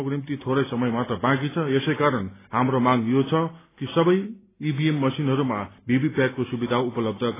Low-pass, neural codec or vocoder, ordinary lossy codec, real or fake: 3.6 kHz; none; AAC, 16 kbps; real